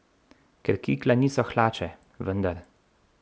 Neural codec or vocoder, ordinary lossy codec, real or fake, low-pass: none; none; real; none